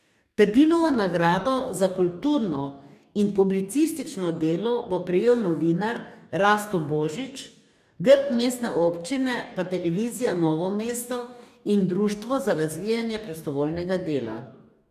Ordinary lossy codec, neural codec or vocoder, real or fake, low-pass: none; codec, 44.1 kHz, 2.6 kbps, DAC; fake; 14.4 kHz